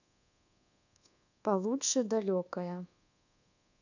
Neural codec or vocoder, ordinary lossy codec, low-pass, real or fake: codec, 24 kHz, 1.2 kbps, DualCodec; none; 7.2 kHz; fake